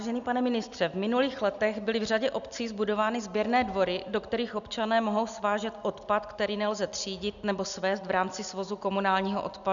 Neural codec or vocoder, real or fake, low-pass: none; real; 7.2 kHz